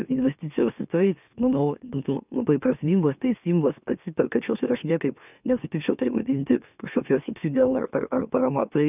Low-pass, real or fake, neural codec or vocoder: 3.6 kHz; fake; autoencoder, 44.1 kHz, a latent of 192 numbers a frame, MeloTTS